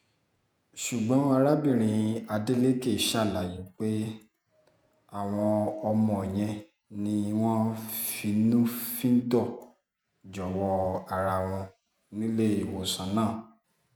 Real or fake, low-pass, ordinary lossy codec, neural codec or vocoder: fake; none; none; vocoder, 48 kHz, 128 mel bands, Vocos